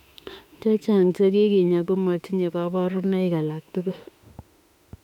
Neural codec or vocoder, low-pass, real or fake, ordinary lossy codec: autoencoder, 48 kHz, 32 numbers a frame, DAC-VAE, trained on Japanese speech; 19.8 kHz; fake; none